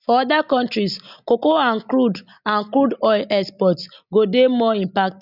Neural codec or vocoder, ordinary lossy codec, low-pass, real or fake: none; none; 5.4 kHz; real